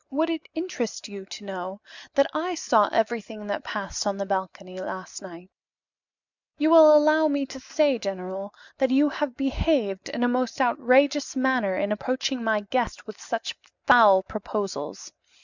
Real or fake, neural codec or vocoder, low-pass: real; none; 7.2 kHz